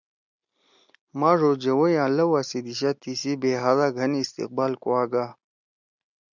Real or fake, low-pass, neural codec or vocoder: real; 7.2 kHz; none